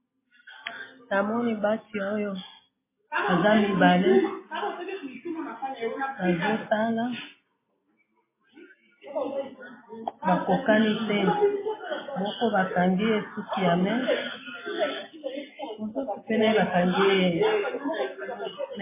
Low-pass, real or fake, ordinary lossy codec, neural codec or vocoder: 3.6 kHz; real; MP3, 16 kbps; none